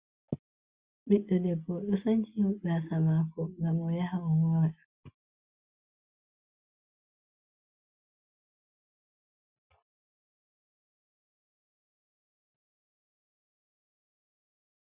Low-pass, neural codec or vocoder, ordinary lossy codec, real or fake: 3.6 kHz; none; Opus, 64 kbps; real